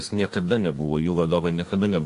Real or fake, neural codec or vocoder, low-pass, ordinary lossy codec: fake; codec, 24 kHz, 1 kbps, SNAC; 10.8 kHz; AAC, 48 kbps